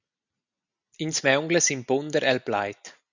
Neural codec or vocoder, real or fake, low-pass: none; real; 7.2 kHz